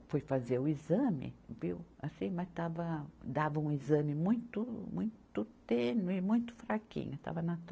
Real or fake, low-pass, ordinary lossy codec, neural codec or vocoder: real; none; none; none